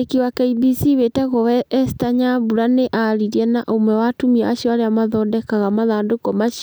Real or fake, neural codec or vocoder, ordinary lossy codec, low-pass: real; none; none; none